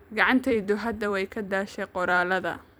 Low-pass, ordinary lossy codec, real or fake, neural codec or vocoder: none; none; fake; vocoder, 44.1 kHz, 128 mel bands every 256 samples, BigVGAN v2